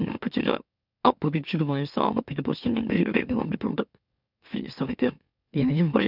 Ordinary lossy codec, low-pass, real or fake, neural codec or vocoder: none; 5.4 kHz; fake; autoencoder, 44.1 kHz, a latent of 192 numbers a frame, MeloTTS